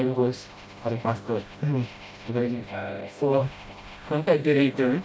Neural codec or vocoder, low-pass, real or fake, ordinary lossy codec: codec, 16 kHz, 0.5 kbps, FreqCodec, smaller model; none; fake; none